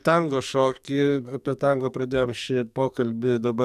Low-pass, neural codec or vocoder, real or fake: 14.4 kHz; codec, 44.1 kHz, 2.6 kbps, SNAC; fake